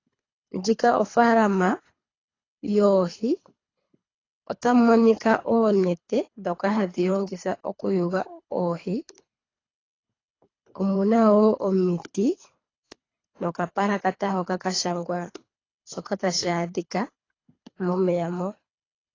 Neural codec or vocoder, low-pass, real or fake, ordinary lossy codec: codec, 24 kHz, 3 kbps, HILCodec; 7.2 kHz; fake; AAC, 32 kbps